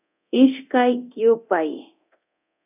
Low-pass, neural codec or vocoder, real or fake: 3.6 kHz; codec, 24 kHz, 0.9 kbps, DualCodec; fake